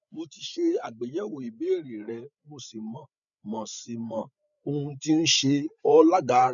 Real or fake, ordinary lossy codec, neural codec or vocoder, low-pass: fake; none; codec, 16 kHz, 8 kbps, FreqCodec, larger model; 7.2 kHz